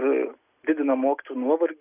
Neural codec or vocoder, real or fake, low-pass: none; real; 3.6 kHz